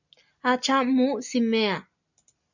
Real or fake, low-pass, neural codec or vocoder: real; 7.2 kHz; none